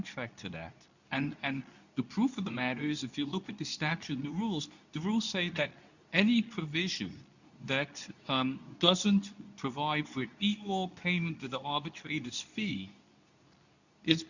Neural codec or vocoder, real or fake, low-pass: codec, 24 kHz, 0.9 kbps, WavTokenizer, medium speech release version 2; fake; 7.2 kHz